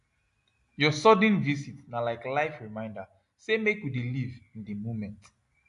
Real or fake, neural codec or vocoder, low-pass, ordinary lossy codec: real; none; 10.8 kHz; AAC, 64 kbps